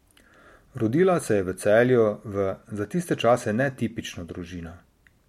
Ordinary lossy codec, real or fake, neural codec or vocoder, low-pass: MP3, 64 kbps; real; none; 19.8 kHz